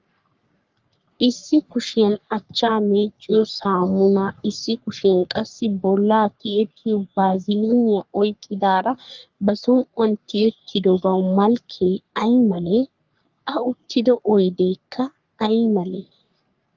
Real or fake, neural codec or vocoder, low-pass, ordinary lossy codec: fake; codec, 44.1 kHz, 3.4 kbps, Pupu-Codec; 7.2 kHz; Opus, 32 kbps